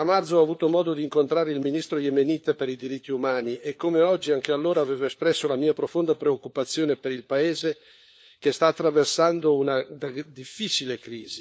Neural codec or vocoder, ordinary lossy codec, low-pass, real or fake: codec, 16 kHz, 4 kbps, FunCodec, trained on LibriTTS, 50 frames a second; none; none; fake